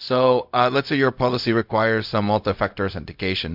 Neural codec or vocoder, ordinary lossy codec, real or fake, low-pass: codec, 16 kHz, 0.4 kbps, LongCat-Audio-Codec; MP3, 48 kbps; fake; 5.4 kHz